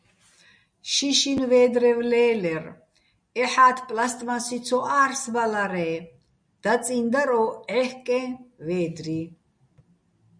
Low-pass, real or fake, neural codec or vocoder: 9.9 kHz; real; none